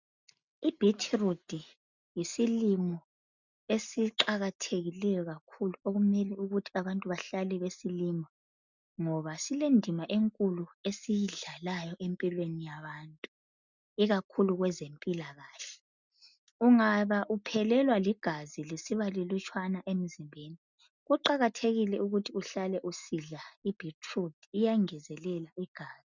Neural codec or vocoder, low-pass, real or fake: none; 7.2 kHz; real